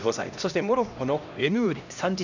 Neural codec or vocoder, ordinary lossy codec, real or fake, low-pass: codec, 16 kHz, 1 kbps, X-Codec, HuBERT features, trained on LibriSpeech; none; fake; 7.2 kHz